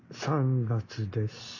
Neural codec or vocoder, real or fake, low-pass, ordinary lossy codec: none; real; 7.2 kHz; none